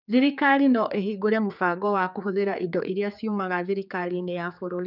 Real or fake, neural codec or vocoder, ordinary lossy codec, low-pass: fake; codec, 16 kHz, 4 kbps, X-Codec, HuBERT features, trained on general audio; none; 5.4 kHz